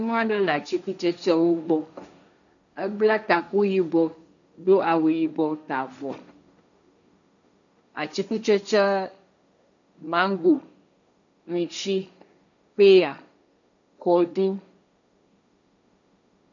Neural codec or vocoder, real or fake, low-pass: codec, 16 kHz, 1.1 kbps, Voila-Tokenizer; fake; 7.2 kHz